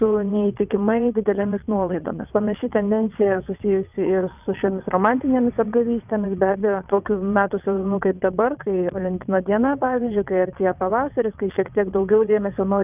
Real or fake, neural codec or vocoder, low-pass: fake; vocoder, 22.05 kHz, 80 mel bands, WaveNeXt; 3.6 kHz